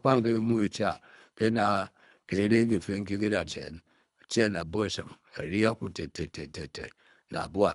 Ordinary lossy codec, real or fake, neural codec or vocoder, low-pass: none; fake; codec, 24 kHz, 3 kbps, HILCodec; 10.8 kHz